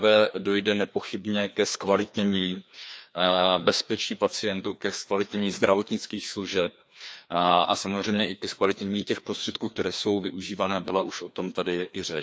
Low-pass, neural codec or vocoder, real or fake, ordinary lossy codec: none; codec, 16 kHz, 2 kbps, FreqCodec, larger model; fake; none